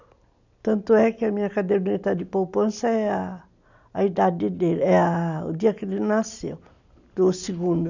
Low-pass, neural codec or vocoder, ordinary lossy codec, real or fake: 7.2 kHz; none; none; real